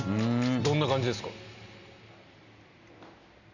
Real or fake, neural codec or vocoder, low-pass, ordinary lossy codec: real; none; 7.2 kHz; none